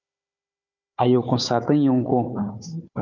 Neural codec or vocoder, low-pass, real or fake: codec, 16 kHz, 4 kbps, FunCodec, trained on Chinese and English, 50 frames a second; 7.2 kHz; fake